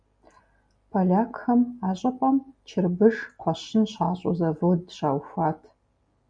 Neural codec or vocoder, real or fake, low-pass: none; real; 9.9 kHz